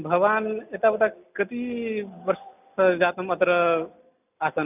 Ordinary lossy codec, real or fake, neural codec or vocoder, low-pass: none; real; none; 3.6 kHz